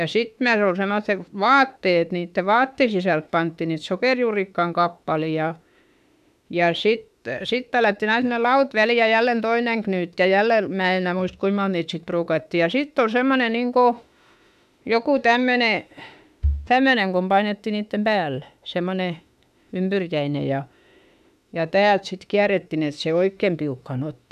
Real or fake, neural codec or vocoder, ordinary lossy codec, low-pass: fake; autoencoder, 48 kHz, 32 numbers a frame, DAC-VAE, trained on Japanese speech; none; 14.4 kHz